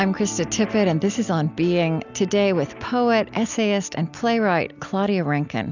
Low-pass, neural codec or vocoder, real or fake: 7.2 kHz; none; real